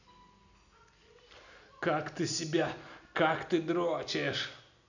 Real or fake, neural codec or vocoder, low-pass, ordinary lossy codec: real; none; 7.2 kHz; none